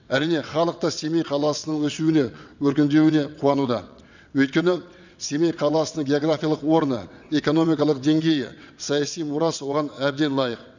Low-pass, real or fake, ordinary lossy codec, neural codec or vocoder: 7.2 kHz; fake; none; vocoder, 22.05 kHz, 80 mel bands, WaveNeXt